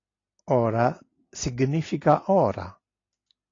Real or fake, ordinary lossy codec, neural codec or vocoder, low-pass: real; AAC, 32 kbps; none; 7.2 kHz